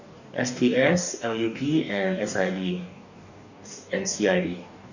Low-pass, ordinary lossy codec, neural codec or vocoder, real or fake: 7.2 kHz; none; codec, 44.1 kHz, 2.6 kbps, DAC; fake